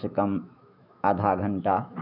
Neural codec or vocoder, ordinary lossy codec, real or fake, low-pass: autoencoder, 48 kHz, 128 numbers a frame, DAC-VAE, trained on Japanese speech; none; fake; 5.4 kHz